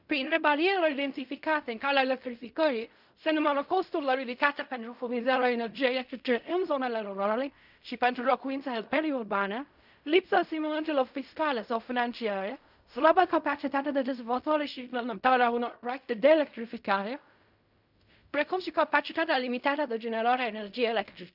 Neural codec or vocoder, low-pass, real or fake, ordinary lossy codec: codec, 16 kHz in and 24 kHz out, 0.4 kbps, LongCat-Audio-Codec, fine tuned four codebook decoder; 5.4 kHz; fake; none